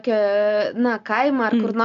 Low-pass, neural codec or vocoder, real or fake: 7.2 kHz; none; real